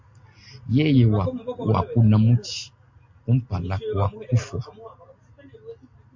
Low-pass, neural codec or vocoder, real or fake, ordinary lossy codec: 7.2 kHz; none; real; MP3, 48 kbps